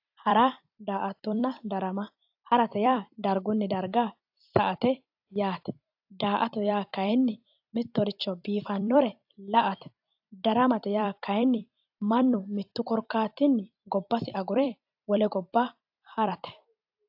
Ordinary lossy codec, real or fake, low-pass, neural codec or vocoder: AAC, 48 kbps; fake; 5.4 kHz; vocoder, 44.1 kHz, 128 mel bands every 256 samples, BigVGAN v2